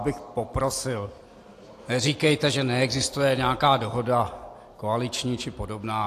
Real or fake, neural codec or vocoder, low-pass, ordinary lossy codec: real; none; 14.4 kHz; AAC, 64 kbps